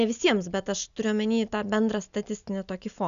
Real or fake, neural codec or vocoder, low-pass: real; none; 7.2 kHz